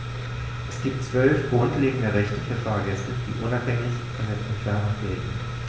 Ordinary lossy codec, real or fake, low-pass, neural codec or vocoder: none; real; none; none